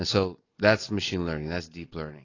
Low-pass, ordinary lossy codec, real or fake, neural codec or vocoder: 7.2 kHz; AAC, 32 kbps; real; none